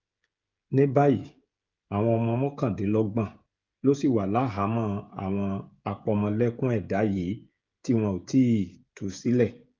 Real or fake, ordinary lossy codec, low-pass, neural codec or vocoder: fake; Opus, 32 kbps; 7.2 kHz; codec, 16 kHz, 16 kbps, FreqCodec, smaller model